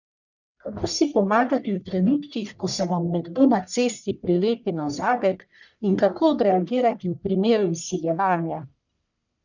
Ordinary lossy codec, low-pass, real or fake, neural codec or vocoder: none; 7.2 kHz; fake; codec, 44.1 kHz, 1.7 kbps, Pupu-Codec